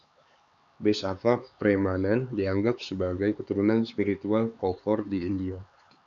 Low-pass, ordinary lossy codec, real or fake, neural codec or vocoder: 7.2 kHz; MP3, 96 kbps; fake; codec, 16 kHz, 4 kbps, X-Codec, HuBERT features, trained on LibriSpeech